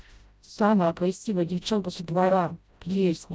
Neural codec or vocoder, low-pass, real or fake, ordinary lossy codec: codec, 16 kHz, 0.5 kbps, FreqCodec, smaller model; none; fake; none